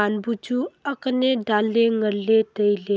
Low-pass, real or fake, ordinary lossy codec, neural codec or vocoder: none; real; none; none